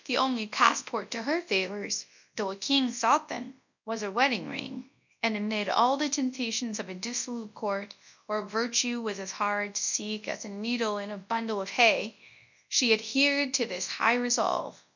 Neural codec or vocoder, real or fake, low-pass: codec, 24 kHz, 0.9 kbps, WavTokenizer, large speech release; fake; 7.2 kHz